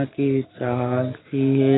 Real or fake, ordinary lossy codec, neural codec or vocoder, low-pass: real; AAC, 16 kbps; none; 7.2 kHz